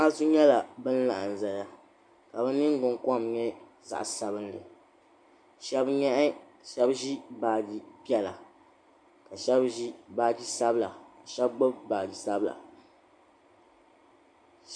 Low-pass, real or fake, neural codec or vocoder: 9.9 kHz; real; none